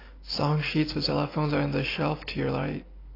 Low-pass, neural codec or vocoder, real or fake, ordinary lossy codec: 5.4 kHz; none; real; AAC, 24 kbps